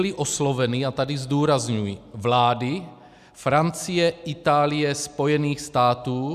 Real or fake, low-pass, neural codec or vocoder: real; 14.4 kHz; none